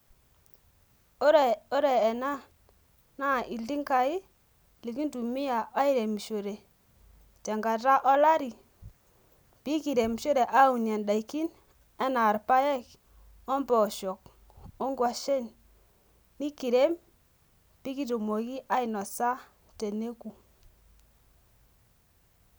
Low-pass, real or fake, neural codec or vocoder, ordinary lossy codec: none; real; none; none